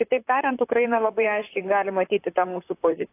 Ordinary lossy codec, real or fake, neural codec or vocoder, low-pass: AAC, 24 kbps; fake; vocoder, 44.1 kHz, 128 mel bands, Pupu-Vocoder; 3.6 kHz